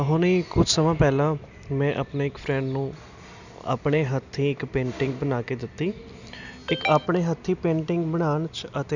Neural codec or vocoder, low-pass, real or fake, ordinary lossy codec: none; 7.2 kHz; real; none